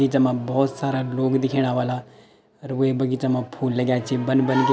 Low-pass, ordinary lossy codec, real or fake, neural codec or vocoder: none; none; real; none